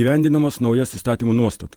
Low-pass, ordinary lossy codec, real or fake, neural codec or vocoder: 19.8 kHz; Opus, 24 kbps; fake; vocoder, 48 kHz, 128 mel bands, Vocos